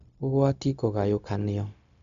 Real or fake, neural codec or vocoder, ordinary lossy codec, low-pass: fake; codec, 16 kHz, 0.4 kbps, LongCat-Audio-Codec; none; 7.2 kHz